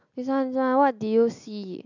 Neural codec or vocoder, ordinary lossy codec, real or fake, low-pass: none; none; real; 7.2 kHz